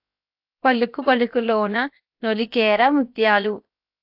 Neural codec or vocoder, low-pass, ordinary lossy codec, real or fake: codec, 16 kHz, 0.7 kbps, FocalCodec; 5.4 kHz; AAC, 48 kbps; fake